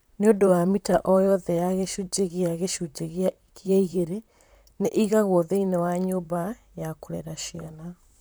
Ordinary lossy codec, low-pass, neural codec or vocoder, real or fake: none; none; vocoder, 44.1 kHz, 128 mel bands, Pupu-Vocoder; fake